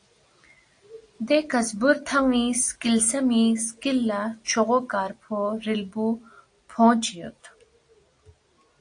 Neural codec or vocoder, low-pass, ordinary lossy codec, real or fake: none; 9.9 kHz; AAC, 48 kbps; real